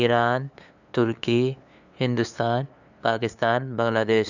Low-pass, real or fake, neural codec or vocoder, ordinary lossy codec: 7.2 kHz; fake; codec, 16 kHz, 2 kbps, FunCodec, trained on LibriTTS, 25 frames a second; none